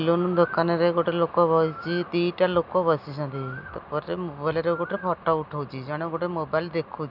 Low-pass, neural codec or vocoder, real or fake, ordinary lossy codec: 5.4 kHz; none; real; none